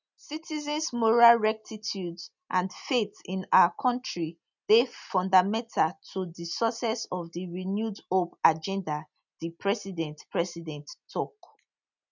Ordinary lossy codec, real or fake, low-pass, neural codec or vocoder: none; real; 7.2 kHz; none